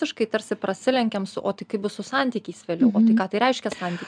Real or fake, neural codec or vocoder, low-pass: real; none; 9.9 kHz